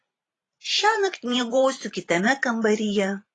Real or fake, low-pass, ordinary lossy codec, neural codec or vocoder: fake; 10.8 kHz; AAC, 32 kbps; vocoder, 44.1 kHz, 128 mel bands every 512 samples, BigVGAN v2